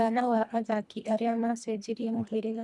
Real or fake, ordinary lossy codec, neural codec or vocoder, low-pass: fake; none; codec, 24 kHz, 1.5 kbps, HILCodec; none